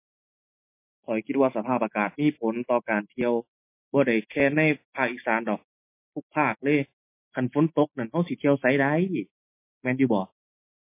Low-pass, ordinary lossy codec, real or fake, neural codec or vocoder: 3.6 kHz; MP3, 24 kbps; real; none